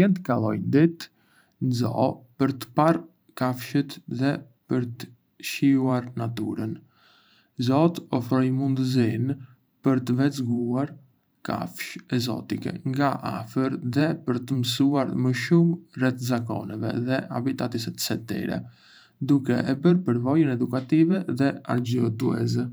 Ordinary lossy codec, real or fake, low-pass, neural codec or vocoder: none; fake; none; vocoder, 44.1 kHz, 128 mel bands every 512 samples, BigVGAN v2